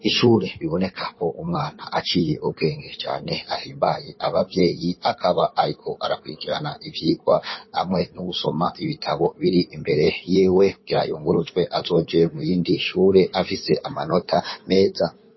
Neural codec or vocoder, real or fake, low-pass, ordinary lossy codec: codec, 16 kHz in and 24 kHz out, 2.2 kbps, FireRedTTS-2 codec; fake; 7.2 kHz; MP3, 24 kbps